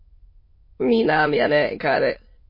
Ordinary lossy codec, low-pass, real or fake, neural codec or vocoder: MP3, 24 kbps; 5.4 kHz; fake; autoencoder, 22.05 kHz, a latent of 192 numbers a frame, VITS, trained on many speakers